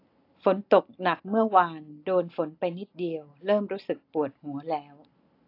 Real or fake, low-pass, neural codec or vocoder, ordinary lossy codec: real; 5.4 kHz; none; AAC, 32 kbps